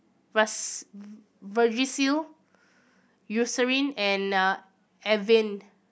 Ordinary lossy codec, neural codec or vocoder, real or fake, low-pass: none; none; real; none